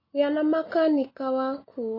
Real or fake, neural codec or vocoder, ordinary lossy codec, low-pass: real; none; MP3, 24 kbps; 5.4 kHz